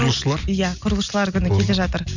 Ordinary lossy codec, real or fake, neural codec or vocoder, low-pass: none; real; none; 7.2 kHz